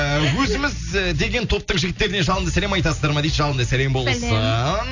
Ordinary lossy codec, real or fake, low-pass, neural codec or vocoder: none; real; 7.2 kHz; none